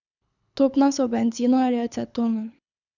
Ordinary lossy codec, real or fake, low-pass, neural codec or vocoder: none; fake; 7.2 kHz; codec, 24 kHz, 6 kbps, HILCodec